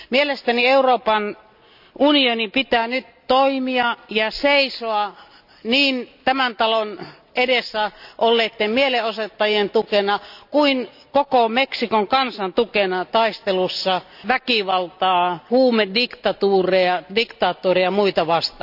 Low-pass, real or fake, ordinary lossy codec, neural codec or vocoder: 5.4 kHz; real; none; none